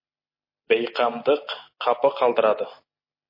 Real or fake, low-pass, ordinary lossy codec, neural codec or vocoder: real; 5.4 kHz; MP3, 24 kbps; none